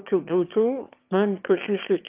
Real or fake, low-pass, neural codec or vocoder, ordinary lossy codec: fake; 3.6 kHz; autoencoder, 22.05 kHz, a latent of 192 numbers a frame, VITS, trained on one speaker; Opus, 24 kbps